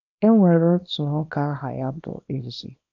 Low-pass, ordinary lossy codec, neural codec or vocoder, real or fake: 7.2 kHz; none; codec, 24 kHz, 0.9 kbps, WavTokenizer, small release; fake